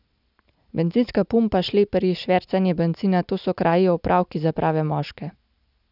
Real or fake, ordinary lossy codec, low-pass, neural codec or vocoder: real; none; 5.4 kHz; none